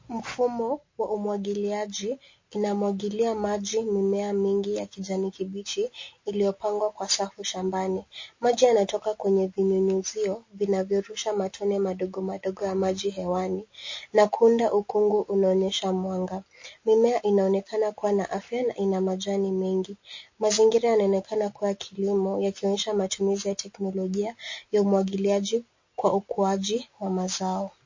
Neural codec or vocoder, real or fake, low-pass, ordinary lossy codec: none; real; 7.2 kHz; MP3, 32 kbps